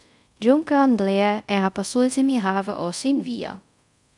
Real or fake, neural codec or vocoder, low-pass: fake; codec, 24 kHz, 0.5 kbps, DualCodec; 10.8 kHz